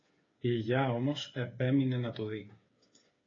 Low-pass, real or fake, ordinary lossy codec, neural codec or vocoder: 7.2 kHz; fake; AAC, 32 kbps; codec, 16 kHz, 8 kbps, FreqCodec, smaller model